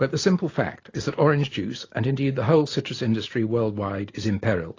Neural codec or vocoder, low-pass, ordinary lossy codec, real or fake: none; 7.2 kHz; AAC, 32 kbps; real